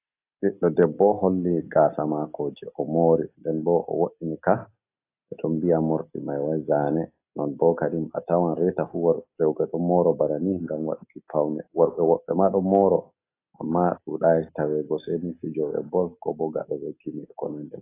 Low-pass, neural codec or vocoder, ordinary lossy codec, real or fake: 3.6 kHz; none; AAC, 24 kbps; real